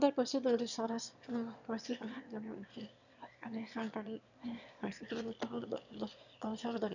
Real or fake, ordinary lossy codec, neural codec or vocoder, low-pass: fake; none; autoencoder, 22.05 kHz, a latent of 192 numbers a frame, VITS, trained on one speaker; 7.2 kHz